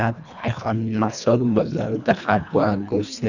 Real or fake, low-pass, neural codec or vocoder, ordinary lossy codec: fake; 7.2 kHz; codec, 24 kHz, 1.5 kbps, HILCodec; none